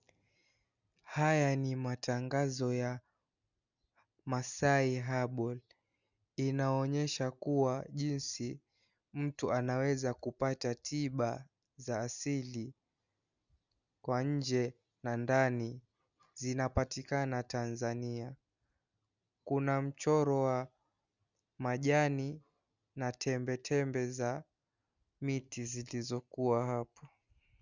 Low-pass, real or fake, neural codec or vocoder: 7.2 kHz; real; none